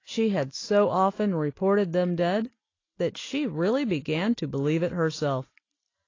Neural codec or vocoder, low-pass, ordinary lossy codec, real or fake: none; 7.2 kHz; AAC, 32 kbps; real